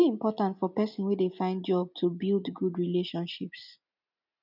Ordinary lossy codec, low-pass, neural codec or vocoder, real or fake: none; 5.4 kHz; none; real